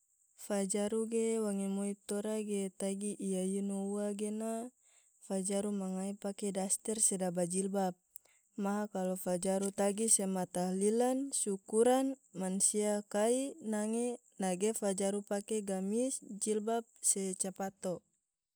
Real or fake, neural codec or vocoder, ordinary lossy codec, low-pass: real; none; none; none